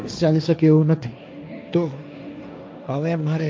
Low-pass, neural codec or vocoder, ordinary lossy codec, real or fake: none; codec, 16 kHz, 1.1 kbps, Voila-Tokenizer; none; fake